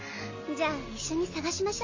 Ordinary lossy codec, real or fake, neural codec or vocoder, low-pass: MP3, 32 kbps; real; none; 7.2 kHz